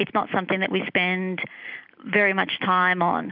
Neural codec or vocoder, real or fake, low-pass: none; real; 5.4 kHz